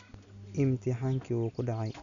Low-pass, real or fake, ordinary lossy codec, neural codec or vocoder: 7.2 kHz; real; none; none